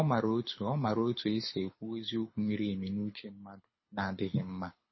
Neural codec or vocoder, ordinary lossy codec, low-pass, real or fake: codec, 24 kHz, 6 kbps, HILCodec; MP3, 24 kbps; 7.2 kHz; fake